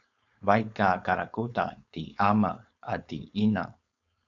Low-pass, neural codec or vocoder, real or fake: 7.2 kHz; codec, 16 kHz, 4.8 kbps, FACodec; fake